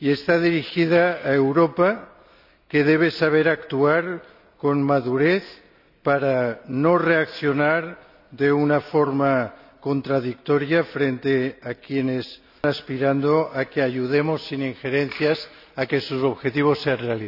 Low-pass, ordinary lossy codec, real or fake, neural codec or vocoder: 5.4 kHz; none; real; none